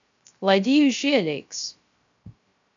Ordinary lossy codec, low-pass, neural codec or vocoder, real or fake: MP3, 64 kbps; 7.2 kHz; codec, 16 kHz, 0.3 kbps, FocalCodec; fake